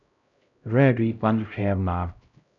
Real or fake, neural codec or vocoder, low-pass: fake; codec, 16 kHz, 0.5 kbps, X-Codec, HuBERT features, trained on LibriSpeech; 7.2 kHz